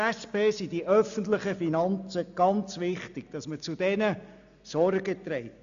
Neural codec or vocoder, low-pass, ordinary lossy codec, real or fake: none; 7.2 kHz; AAC, 96 kbps; real